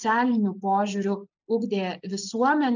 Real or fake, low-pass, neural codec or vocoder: real; 7.2 kHz; none